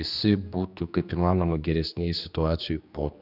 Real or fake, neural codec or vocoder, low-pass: fake; codec, 16 kHz, 2 kbps, X-Codec, HuBERT features, trained on general audio; 5.4 kHz